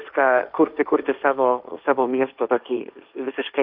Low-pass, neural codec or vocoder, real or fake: 5.4 kHz; codec, 16 kHz, 1.1 kbps, Voila-Tokenizer; fake